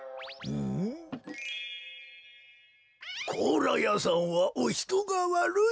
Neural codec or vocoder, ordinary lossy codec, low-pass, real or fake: none; none; none; real